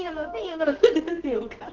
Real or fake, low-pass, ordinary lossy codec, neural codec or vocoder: fake; 7.2 kHz; Opus, 16 kbps; codec, 24 kHz, 0.9 kbps, WavTokenizer, medium music audio release